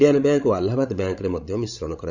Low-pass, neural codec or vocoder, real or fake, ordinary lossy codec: 7.2 kHz; codec, 16 kHz, 16 kbps, FreqCodec, larger model; fake; Opus, 64 kbps